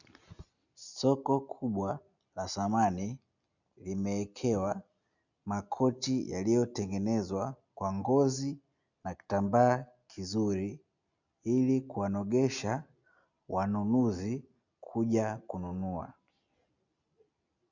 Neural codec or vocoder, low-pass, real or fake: none; 7.2 kHz; real